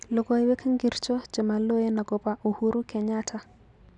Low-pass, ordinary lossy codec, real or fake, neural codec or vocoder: 10.8 kHz; none; real; none